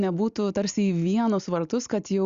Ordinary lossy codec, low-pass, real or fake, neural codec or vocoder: Opus, 64 kbps; 7.2 kHz; real; none